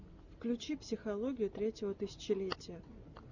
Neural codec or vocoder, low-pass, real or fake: none; 7.2 kHz; real